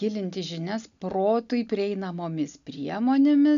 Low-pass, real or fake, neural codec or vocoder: 7.2 kHz; real; none